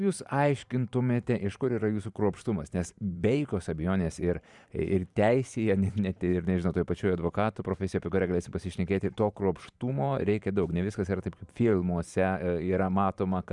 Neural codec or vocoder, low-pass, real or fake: vocoder, 44.1 kHz, 128 mel bands every 512 samples, BigVGAN v2; 10.8 kHz; fake